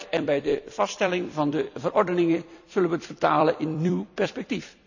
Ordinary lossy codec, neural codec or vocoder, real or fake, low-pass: none; vocoder, 44.1 kHz, 128 mel bands every 256 samples, BigVGAN v2; fake; 7.2 kHz